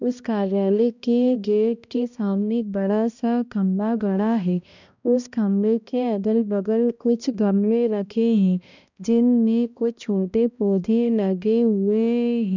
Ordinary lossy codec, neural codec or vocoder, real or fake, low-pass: none; codec, 16 kHz, 1 kbps, X-Codec, HuBERT features, trained on balanced general audio; fake; 7.2 kHz